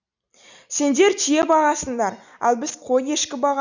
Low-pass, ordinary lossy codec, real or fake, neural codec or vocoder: 7.2 kHz; none; real; none